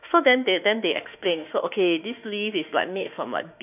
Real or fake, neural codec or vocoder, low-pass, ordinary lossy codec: fake; autoencoder, 48 kHz, 32 numbers a frame, DAC-VAE, trained on Japanese speech; 3.6 kHz; none